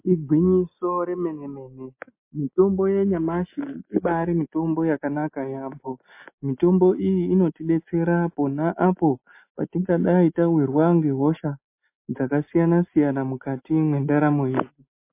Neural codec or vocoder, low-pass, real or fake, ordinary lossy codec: none; 3.6 kHz; real; MP3, 24 kbps